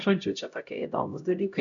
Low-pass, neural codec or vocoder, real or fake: 7.2 kHz; codec, 16 kHz, 0.5 kbps, X-Codec, HuBERT features, trained on LibriSpeech; fake